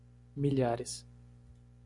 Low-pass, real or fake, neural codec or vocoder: 10.8 kHz; real; none